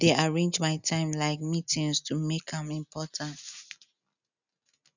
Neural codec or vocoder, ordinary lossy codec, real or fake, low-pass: none; none; real; 7.2 kHz